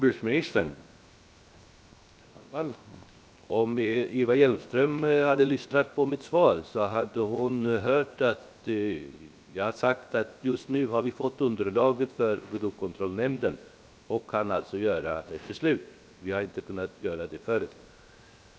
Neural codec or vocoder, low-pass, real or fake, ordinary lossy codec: codec, 16 kHz, 0.7 kbps, FocalCodec; none; fake; none